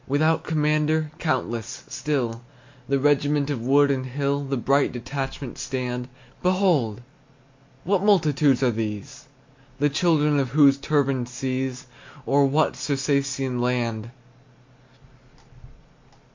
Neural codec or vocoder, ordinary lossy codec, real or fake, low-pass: none; MP3, 48 kbps; real; 7.2 kHz